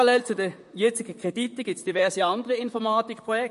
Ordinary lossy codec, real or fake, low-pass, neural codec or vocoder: MP3, 48 kbps; fake; 14.4 kHz; vocoder, 44.1 kHz, 128 mel bands, Pupu-Vocoder